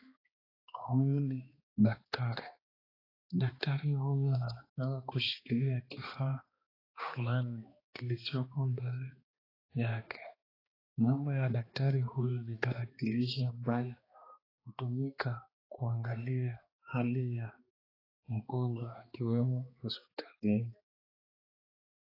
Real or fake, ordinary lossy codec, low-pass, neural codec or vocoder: fake; AAC, 24 kbps; 5.4 kHz; codec, 16 kHz, 2 kbps, X-Codec, HuBERT features, trained on balanced general audio